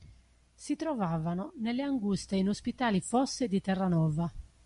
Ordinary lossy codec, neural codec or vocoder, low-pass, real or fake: MP3, 96 kbps; none; 10.8 kHz; real